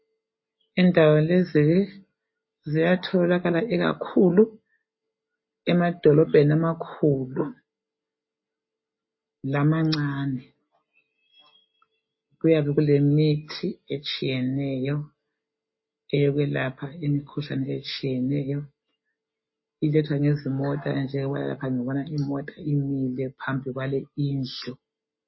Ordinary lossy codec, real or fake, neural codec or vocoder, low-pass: MP3, 24 kbps; real; none; 7.2 kHz